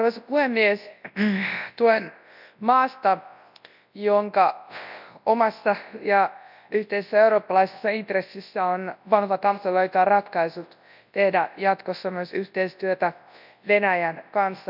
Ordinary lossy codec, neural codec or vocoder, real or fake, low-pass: none; codec, 24 kHz, 0.9 kbps, WavTokenizer, large speech release; fake; 5.4 kHz